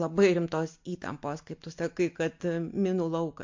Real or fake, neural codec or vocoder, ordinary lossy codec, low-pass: real; none; MP3, 48 kbps; 7.2 kHz